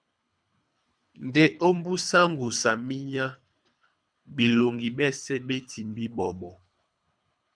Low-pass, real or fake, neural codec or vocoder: 9.9 kHz; fake; codec, 24 kHz, 3 kbps, HILCodec